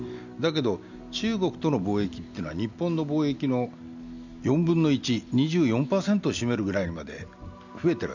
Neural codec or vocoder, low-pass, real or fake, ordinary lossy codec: none; 7.2 kHz; real; none